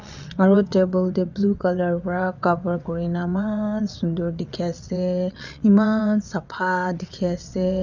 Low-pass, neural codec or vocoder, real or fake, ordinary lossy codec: 7.2 kHz; vocoder, 22.05 kHz, 80 mel bands, WaveNeXt; fake; none